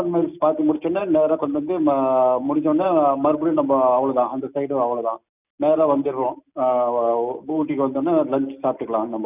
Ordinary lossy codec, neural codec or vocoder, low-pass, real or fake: none; none; 3.6 kHz; real